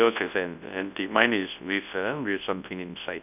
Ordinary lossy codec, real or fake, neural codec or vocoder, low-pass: none; fake; codec, 24 kHz, 0.9 kbps, WavTokenizer, large speech release; 3.6 kHz